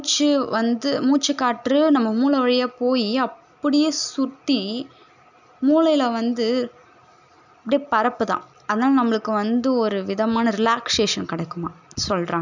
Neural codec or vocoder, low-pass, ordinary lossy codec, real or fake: none; 7.2 kHz; none; real